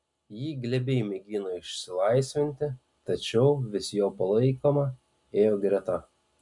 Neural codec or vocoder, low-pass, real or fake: none; 10.8 kHz; real